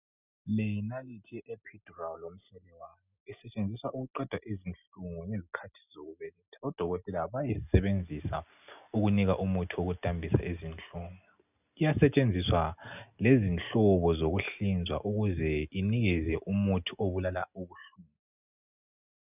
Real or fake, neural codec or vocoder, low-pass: real; none; 3.6 kHz